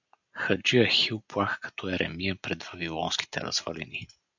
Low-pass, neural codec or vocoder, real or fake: 7.2 kHz; none; real